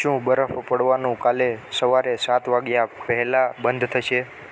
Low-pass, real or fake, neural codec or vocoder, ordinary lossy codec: none; real; none; none